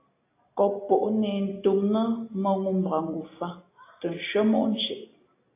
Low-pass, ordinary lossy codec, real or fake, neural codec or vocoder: 3.6 kHz; AAC, 24 kbps; real; none